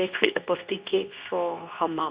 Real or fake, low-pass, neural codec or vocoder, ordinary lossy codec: fake; 3.6 kHz; codec, 24 kHz, 0.9 kbps, WavTokenizer, medium speech release version 2; Opus, 64 kbps